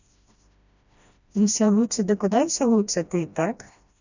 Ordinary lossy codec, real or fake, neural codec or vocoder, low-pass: none; fake; codec, 16 kHz, 1 kbps, FreqCodec, smaller model; 7.2 kHz